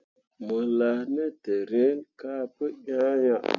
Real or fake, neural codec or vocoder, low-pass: real; none; 7.2 kHz